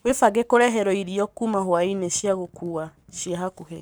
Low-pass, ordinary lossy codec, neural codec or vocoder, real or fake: none; none; codec, 44.1 kHz, 7.8 kbps, Pupu-Codec; fake